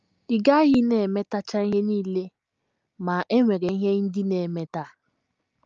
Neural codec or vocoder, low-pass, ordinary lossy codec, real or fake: none; 7.2 kHz; Opus, 24 kbps; real